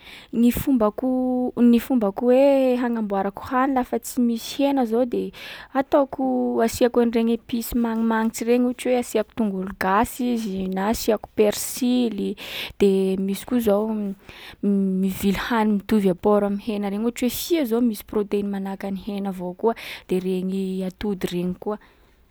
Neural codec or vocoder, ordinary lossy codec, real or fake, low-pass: none; none; real; none